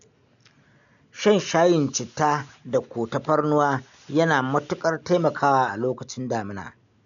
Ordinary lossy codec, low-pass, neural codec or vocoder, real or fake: none; 7.2 kHz; none; real